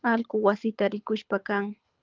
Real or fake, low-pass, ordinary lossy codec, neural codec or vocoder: fake; 7.2 kHz; Opus, 32 kbps; codec, 24 kHz, 6 kbps, HILCodec